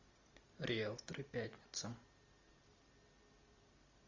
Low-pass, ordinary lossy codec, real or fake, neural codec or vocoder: 7.2 kHz; AAC, 48 kbps; real; none